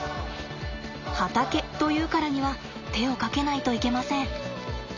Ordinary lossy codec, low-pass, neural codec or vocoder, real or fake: none; 7.2 kHz; none; real